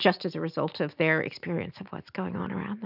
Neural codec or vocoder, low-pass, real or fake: none; 5.4 kHz; real